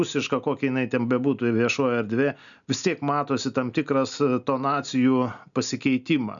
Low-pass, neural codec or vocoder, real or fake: 7.2 kHz; none; real